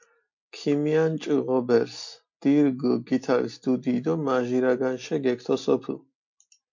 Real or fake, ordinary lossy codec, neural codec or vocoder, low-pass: real; MP3, 48 kbps; none; 7.2 kHz